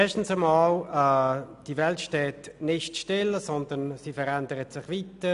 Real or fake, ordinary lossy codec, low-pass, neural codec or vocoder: real; none; 10.8 kHz; none